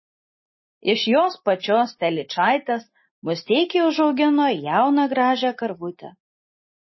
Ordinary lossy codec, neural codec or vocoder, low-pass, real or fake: MP3, 24 kbps; none; 7.2 kHz; real